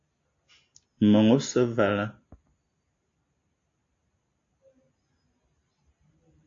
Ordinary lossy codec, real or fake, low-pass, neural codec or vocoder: AAC, 48 kbps; real; 7.2 kHz; none